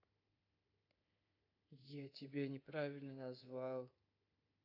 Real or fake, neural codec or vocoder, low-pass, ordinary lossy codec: fake; codec, 24 kHz, 3.1 kbps, DualCodec; 5.4 kHz; AAC, 48 kbps